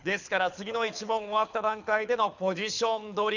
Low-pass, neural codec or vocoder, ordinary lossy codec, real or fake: 7.2 kHz; codec, 24 kHz, 6 kbps, HILCodec; none; fake